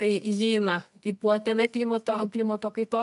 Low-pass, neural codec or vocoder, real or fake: 10.8 kHz; codec, 24 kHz, 0.9 kbps, WavTokenizer, medium music audio release; fake